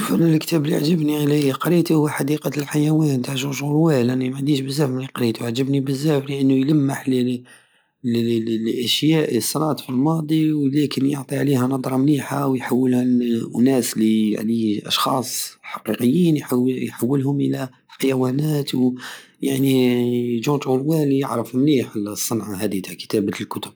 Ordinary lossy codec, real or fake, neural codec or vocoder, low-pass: none; real; none; none